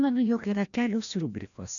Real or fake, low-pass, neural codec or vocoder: fake; 7.2 kHz; codec, 16 kHz, 1 kbps, FreqCodec, larger model